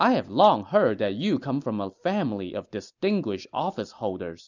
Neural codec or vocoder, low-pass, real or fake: none; 7.2 kHz; real